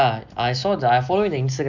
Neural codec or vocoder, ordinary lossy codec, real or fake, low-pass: none; none; real; 7.2 kHz